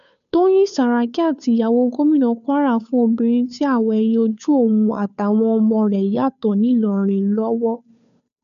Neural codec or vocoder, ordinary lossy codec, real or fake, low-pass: codec, 16 kHz, 2 kbps, FunCodec, trained on Chinese and English, 25 frames a second; none; fake; 7.2 kHz